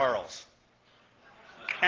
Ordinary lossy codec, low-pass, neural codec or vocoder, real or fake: Opus, 16 kbps; 7.2 kHz; none; real